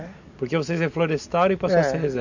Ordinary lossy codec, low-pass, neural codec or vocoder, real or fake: none; 7.2 kHz; none; real